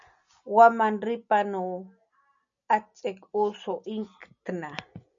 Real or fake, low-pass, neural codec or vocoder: real; 7.2 kHz; none